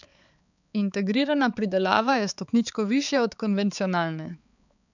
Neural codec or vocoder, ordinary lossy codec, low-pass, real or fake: codec, 16 kHz, 4 kbps, X-Codec, HuBERT features, trained on balanced general audio; none; 7.2 kHz; fake